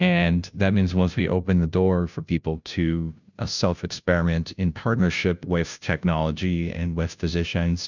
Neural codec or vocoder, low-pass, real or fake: codec, 16 kHz, 0.5 kbps, FunCodec, trained on Chinese and English, 25 frames a second; 7.2 kHz; fake